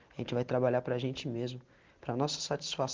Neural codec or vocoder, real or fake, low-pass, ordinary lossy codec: none; real; 7.2 kHz; Opus, 24 kbps